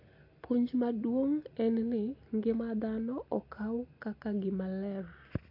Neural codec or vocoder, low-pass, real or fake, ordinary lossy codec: none; 5.4 kHz; real; AAC, 32 kbps